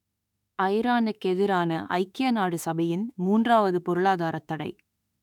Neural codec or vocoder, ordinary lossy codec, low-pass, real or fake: autoencoder, 48 kHz, 32 numbers a frame, DAC-VAE, trained on Japanese speech; none; 19.8 kHz; fake